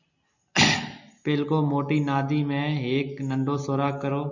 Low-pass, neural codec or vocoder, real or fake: 7.2 kHz; none; real